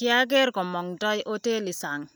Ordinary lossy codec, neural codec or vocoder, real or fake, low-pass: none; none; real; none